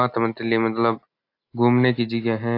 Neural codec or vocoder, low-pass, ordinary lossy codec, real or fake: none; 5.4 kHz; AAC, 32 kbps; real